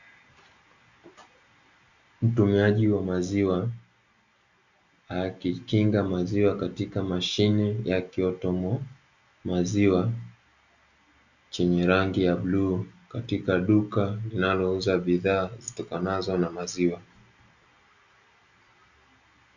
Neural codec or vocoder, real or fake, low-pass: none; real; 7.2 kHz